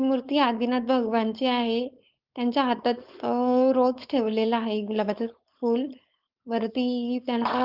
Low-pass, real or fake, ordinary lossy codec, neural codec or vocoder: 5.4 kHz; fake; Opus, 32 kbps; codec, 16 kHz, 4.8 kbps, FACodec